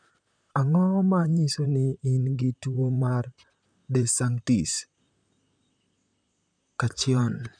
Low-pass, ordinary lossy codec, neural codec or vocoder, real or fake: 9.9 kHz; MP3, 96 kbps; vocoder, 44.1 kHz, 128 mel bands, Pupu-Vocoder; fake